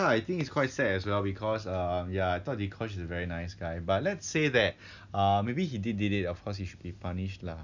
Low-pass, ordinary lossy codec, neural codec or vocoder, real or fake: 7.2 kHz; none; none; real